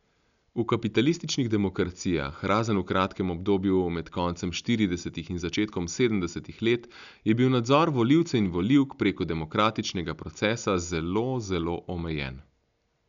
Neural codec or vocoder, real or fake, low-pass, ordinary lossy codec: none; real; 7.2 kHz; none